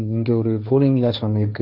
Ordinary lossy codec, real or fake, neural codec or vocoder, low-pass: none; fake; codec, 16 kHz, 1.1 kbps, Voila-Tokenizer; 5.4 kHz